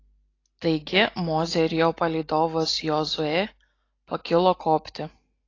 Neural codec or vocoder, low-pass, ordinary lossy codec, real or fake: none; 7.2 kHz; AAC, 32 kbps; real